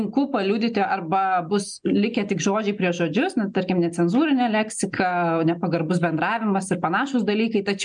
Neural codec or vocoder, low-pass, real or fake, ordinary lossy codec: none; 9.9 kHz; real; MP3, 96 kbps